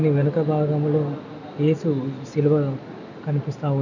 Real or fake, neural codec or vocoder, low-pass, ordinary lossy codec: real; none; 7.2 kHz; none